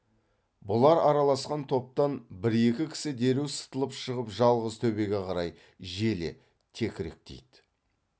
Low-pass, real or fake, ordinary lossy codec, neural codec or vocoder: none; real; none; none